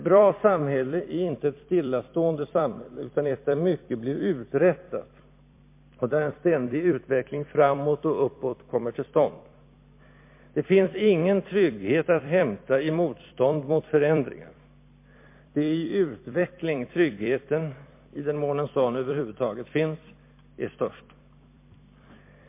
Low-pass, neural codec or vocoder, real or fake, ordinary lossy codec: 3.6 kHz; vocoder, 44.1 kHz, 128 mel bands every 256 samples, BigVGAN v2; fake; MP3, 24 kbps